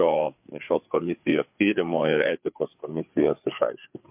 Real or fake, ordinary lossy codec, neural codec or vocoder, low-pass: fake; MP3, 32 kbps; codec, 16 kHz, 4 kbps, FreqCodec, larger model; 3.6 kHz